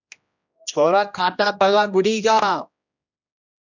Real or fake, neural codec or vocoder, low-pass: fake; codec, 16 kHz, 1 kbps, X-Codec, HuBERT features, trained on general audio; 7.2 kHz